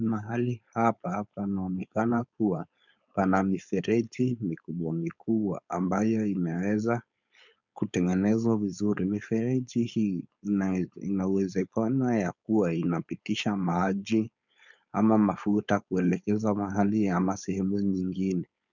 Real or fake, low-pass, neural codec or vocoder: fake; 7.2 kHz; codec, 16 kHz, 4.8 kbps, FACodec